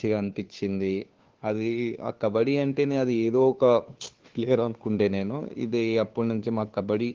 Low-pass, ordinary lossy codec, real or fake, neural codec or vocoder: 7.2 kHz; Opus, 16 kbps; fake; codec, 16 kHz, 2 kbps, FunCodec, trained on Chinese and English, 25 frames a second